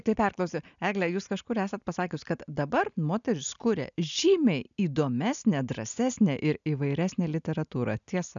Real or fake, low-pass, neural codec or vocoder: real; 7.2 kHz; none